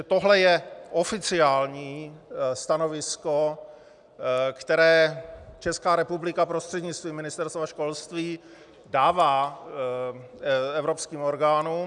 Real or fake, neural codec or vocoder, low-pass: real; none; 10.8 kHz